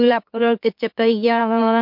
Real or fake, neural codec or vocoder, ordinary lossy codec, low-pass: fake; autoencoder, 44.1 kHz, a latent of 192 numbers a frame, MeloTTS; MP3, 48 kbps; 5.4 kHz